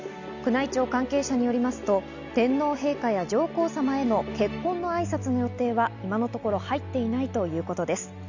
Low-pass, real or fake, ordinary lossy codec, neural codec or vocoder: 7.2 kHz; real; none; none